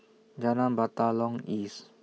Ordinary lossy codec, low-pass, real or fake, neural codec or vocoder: none; none; real; none